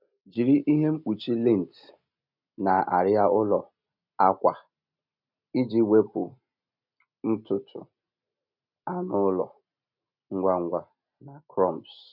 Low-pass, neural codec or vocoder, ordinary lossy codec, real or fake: 5.4 kHz; none; none; real